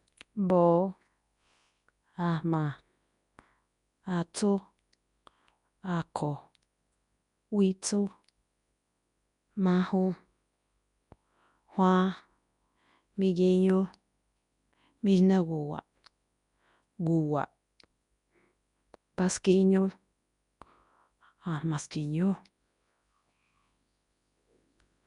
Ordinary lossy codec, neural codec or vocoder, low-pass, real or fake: MP3, 96 kbps; codec, 24 kHz, 0.9 kbps, WavTokenizer, large speech release; 10.8 kHz; fake